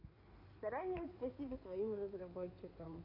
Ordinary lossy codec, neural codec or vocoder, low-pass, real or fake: none; codec, 16 kHz in and 24 kHz out, 2.2 kbps, FireRedTTS-2 codec; 5.4 kHz; fake